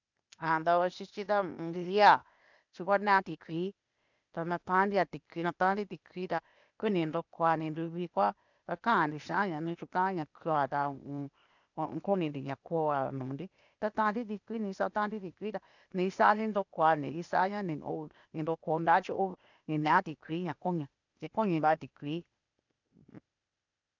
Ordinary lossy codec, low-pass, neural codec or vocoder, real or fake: none; 7.2 kHz; codec, 16 kHz, 0.8 kbps, ZipCodec; fake